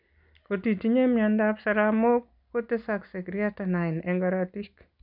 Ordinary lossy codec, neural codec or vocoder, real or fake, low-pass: none; none; real; 5.4 kHz